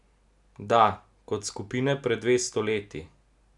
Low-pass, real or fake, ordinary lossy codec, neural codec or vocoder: 10.8 kHz; real; none; none